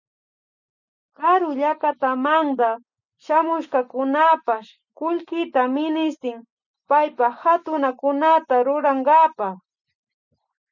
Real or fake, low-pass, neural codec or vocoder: real; 7.2 kHz; none